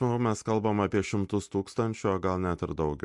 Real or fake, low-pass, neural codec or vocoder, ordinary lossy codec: real; 10.8 kHz; none; MP3, 64 kbps